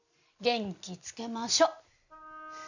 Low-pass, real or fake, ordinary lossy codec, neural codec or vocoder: 7.2 kHz; real; none; none